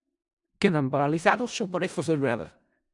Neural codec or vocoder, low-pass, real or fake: codec, 16 kHz in and 24 kHz out, 0.4 kbps, LongCat-Audio-Codec, four codebook decoder; 10.8 kHz; fake